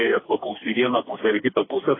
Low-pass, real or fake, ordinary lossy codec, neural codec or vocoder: 7.2 kHz; fake; AAC, 16 kbps; codec, 16 kHz, 2 kbps, FreqCodec, smaller model